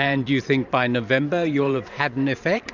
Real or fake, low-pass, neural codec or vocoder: fake; 7.2 kHz; vocoder, 44.1 kHz, 128 mel bands every 512 samples, BigVGAN v2